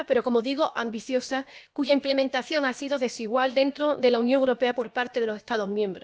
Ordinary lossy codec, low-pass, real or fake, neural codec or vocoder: none; none; fake; codec, 16 kHz, about 1 kbps, DyCAST, with the encoder's durations